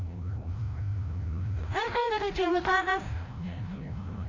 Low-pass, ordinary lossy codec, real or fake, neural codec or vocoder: 7.2 kHz; AAC, 32 kbps; fake; codec, 16 kHz, 0.5 kbps, FreqCodec, larger model